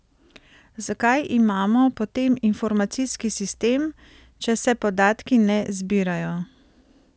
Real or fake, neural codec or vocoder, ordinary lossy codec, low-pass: real; none; none; none